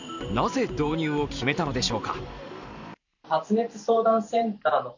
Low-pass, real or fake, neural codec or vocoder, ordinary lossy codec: 7.2 kHz; fake; vocoder, 44.1 kHz, 128 mel bands every 512 samples, BigVGAN v2; none